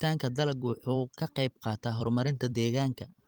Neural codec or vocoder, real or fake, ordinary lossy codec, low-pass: vocoder, 44.1 kHz, 128 mel bands every 256 samples, BigVGAN v2; fake; Opus, 24 kbps; 19.8 kHz